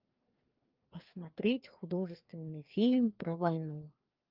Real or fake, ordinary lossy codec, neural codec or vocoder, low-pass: fake; Opus, 32 kbps; codec, 16 kHz, 2 kbps, FreqCodec, larger model; 5.4 kHz